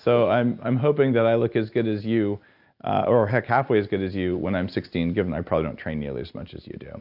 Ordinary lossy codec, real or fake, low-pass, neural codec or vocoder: AAC, 48 kbps; real; 5.4 kHz; none